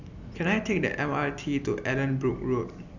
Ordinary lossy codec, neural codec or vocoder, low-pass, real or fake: none; none; 7.2 kHz; real